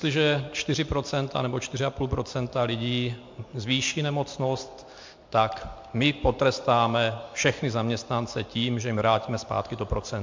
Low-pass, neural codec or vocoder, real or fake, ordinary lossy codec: 7.2 kHz; none; real; MP3, 48 kbps